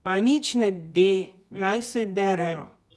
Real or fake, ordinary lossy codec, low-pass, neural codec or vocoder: fake; none; none; codec, 24 kHz, 0.9 kbps, WavTokenizer, medium music audio release